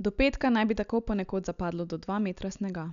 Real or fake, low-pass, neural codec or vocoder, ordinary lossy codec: real; 7.2 kHz; none; none